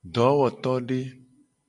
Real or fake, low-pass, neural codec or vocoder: real; 10.8 kHz; none